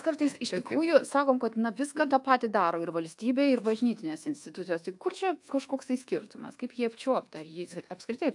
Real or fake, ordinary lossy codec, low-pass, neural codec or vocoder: fake; AAC, 64 kbps; 10.8 kHz; codec, 24 kHz, 1.2 kbps, DualCodec